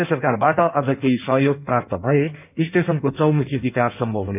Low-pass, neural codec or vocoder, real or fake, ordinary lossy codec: 3.6 kHz; codec, 16 kHz in and 24 kHz out, 1.1 kbps, FireRedTTS-2 codec; fake; none